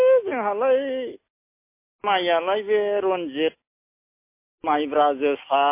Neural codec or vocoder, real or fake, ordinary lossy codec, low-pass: none; real; MP3, 24 kbps; 3.6 kHz